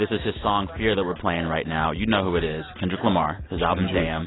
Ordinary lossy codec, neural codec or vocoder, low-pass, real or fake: AAC, 16 kbps; none; 7.2 kHz; real